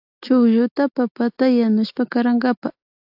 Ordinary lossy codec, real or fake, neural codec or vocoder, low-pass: AAC, 48 kbps; real; none; 5.4 kHz